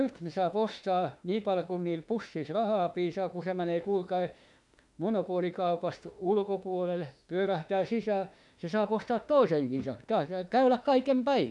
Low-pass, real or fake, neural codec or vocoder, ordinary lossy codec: 10.8 kHz; fake; autoencoder, 48 kHz, 32 numbers a frame, DAC-VAE, trained on Japanese speech; none